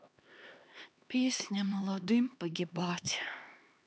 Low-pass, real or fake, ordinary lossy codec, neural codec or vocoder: none; fake; none; codec, 16 kHz, 2 kbps, X-Codec, HuBERT features, trained on LibriSpeech